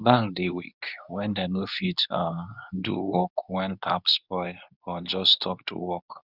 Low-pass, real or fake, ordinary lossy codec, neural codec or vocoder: 5.4 kHz; fake; none; codec, 24 kHz, 0.9 kbps, WavTokenizer, medium speech release version 2